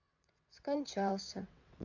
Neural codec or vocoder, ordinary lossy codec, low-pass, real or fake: vocoder, 22.05 kHz, 80 mel bands, WaveNeXt; none; 7.2 kHz; fake